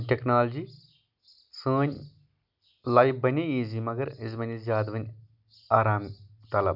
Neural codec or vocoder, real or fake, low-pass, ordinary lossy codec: none; real; 5.4 kHz; none